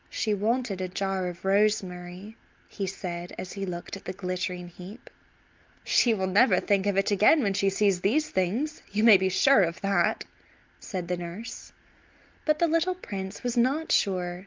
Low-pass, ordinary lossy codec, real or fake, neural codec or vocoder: 7.2 kHz; Opus, 32 kbps; real; none